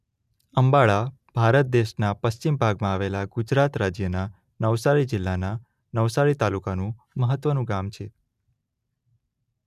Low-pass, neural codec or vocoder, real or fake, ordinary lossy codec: 14.4 kHz; none; real; none